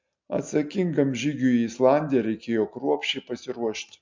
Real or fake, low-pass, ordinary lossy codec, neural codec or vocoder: real; 7.2 kHz; MP3, 64 kbps; none